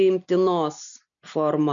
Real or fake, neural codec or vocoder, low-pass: real; none; 7.2 kHz